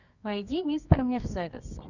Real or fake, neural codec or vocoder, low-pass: fake; codec, 24 kHz, 0.9 kbps, WavTokenizer, medium music audio release; 7.2 kHz